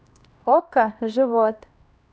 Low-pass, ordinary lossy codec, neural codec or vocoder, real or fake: none; none; codec, 16 kHz, 1 kbps, X-Codec, HuBERT features, trained on LibriSpeech; fake